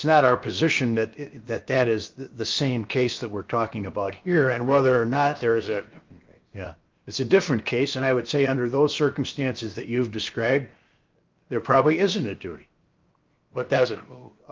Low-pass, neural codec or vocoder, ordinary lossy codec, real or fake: 7.2 kHz; codec, 16 kHz, 0.7 kbps, FocalCodec; Opus, 24 kbps; fake